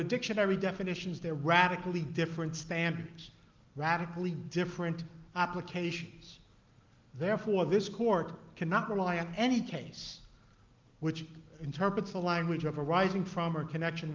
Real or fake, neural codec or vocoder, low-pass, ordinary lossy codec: real; none; 7.2 kHz; Opus, 32 kbps